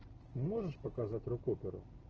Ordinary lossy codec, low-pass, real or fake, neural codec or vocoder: Opus, 16 kbps; 7.2 kHz; real; none